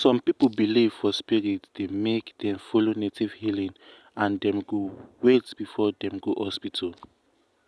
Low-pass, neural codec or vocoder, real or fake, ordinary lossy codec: none; none; real; none